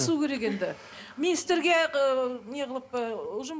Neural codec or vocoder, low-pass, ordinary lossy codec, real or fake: none; none; none; real